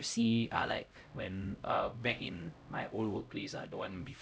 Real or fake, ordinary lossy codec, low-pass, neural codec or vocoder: fake; none; none; codec, 16 kHz, 0.5 kbps, X-Codec, HuBERT features, trained on LibriSpeech